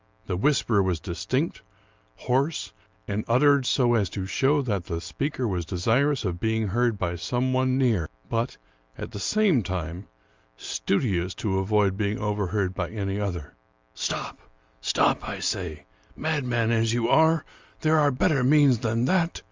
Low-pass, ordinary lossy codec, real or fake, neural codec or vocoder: 7.2 kHz; Opus, 32 kbps; real; none